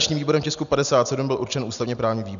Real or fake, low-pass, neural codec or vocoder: real; 7.2 kHz; none